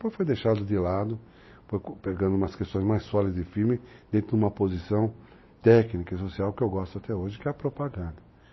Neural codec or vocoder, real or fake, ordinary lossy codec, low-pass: none; real; MP3, 24 kbps; 7.2 kHz